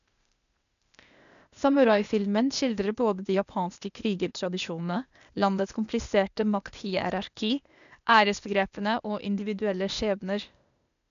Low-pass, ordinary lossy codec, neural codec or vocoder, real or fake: 7.2 kHz; none; codec, 16 kHz, 0.8 kbps, ZipCodec; fake